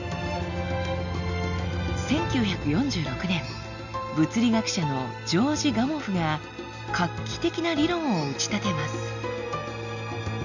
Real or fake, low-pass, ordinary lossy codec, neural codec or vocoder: real; 7.2 kHz; none; none